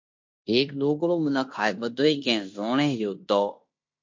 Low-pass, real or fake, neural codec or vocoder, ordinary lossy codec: 7.2 kHz; fake; codec, 16 kHz in and 24 kHz out, 0.9 kbps, LongCat-Audio-Codec, fine tuned four codebook decoder; MP3, 48 kbps